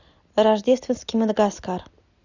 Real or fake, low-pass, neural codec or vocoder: real; 7.2 kHz; none